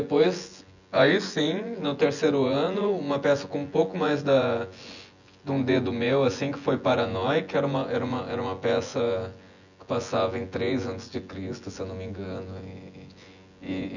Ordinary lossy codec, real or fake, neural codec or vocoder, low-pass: none; fake; vocoder, 24 kHz, 100 mel bands, Vocos; 7.2 kHz